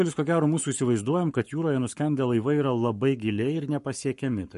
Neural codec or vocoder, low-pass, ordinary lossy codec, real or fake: codec, 44.1 kHz, 7.8 kbps, Pupu-Codec; 14.4 kHz; MP3, 48 kbps; fake